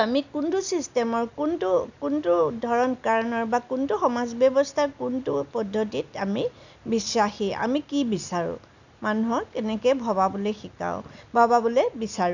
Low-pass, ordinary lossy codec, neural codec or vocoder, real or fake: 7.2 kHz; none; none; real